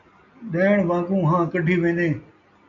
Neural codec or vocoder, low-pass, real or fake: none; 7.2 kHz; real